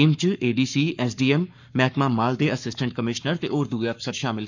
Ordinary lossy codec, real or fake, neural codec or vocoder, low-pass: none; fake; codec, 44.1 kHz, 7.8 kbps, Pupu-Codec; 7.2 kHz